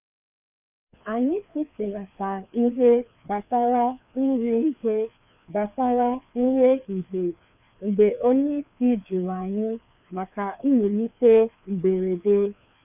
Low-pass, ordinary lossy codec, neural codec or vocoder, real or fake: 3.6 kHz; none; codec, 16 kHz, 2 kbps, FreqCodec, larger model; fake